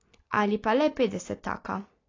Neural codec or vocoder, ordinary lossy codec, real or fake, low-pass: none; AAC, 32 kbps; real; 7.2 kHz